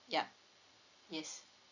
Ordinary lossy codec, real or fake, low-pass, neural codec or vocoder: none; real; 7.2 kHz; none